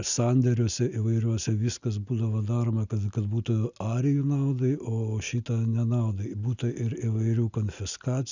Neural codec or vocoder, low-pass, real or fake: none; 7.2 kHz; real